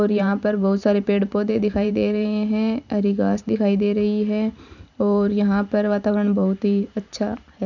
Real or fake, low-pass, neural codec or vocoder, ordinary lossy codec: fake; 7.2 kHz; vocoder, 44.1 kHz, 128 mel bands every 512 samples, BigVGAN v2; none